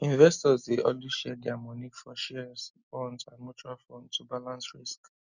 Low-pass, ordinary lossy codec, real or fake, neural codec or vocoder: 7.2 kHz; none; real; none